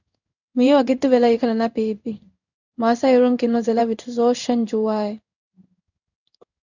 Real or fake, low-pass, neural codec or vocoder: fake; 7.2 kHz; codec, 16 kHz in and 24 kHz out, 1 kbps, XY-Tokenizer